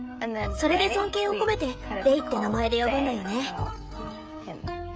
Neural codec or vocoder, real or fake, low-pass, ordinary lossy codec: codec, 16 kHz, 16 kbps, FreqCodec, smaller model; fake; none; none